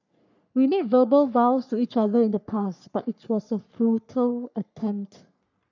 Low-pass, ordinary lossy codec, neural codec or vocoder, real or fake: 7.2 kHz; none; codec, 44.1 kHz, 3.4 kbps, Pupu-Codec; fake